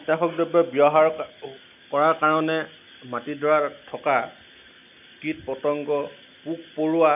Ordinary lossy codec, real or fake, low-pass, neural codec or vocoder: none; real; 3.6 kHz; none